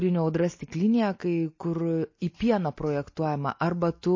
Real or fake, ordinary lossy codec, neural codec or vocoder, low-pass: real; MP3, 32 kbps; none; 7.2 kHz